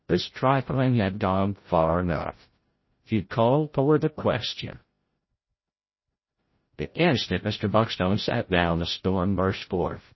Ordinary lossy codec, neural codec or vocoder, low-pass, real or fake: MP3, 24 kbps; codec, 16 kHz, 0.5 kbps, FreqCodec, larger model; 7.2 kHz; fake